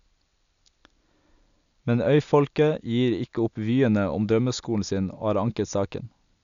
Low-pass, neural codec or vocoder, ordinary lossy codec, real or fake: 7.2 kHz; none; none; real